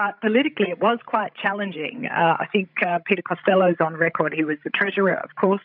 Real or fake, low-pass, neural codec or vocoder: fake; 5.4 kHz; codec, 16 kHz, 16 kbps, FreqCodec, larger model